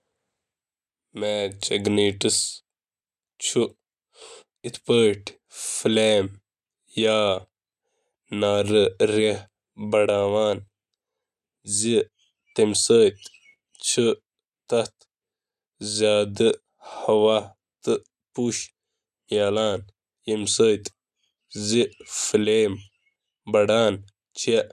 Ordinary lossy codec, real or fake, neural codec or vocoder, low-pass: none; real; none; 14.4 kHz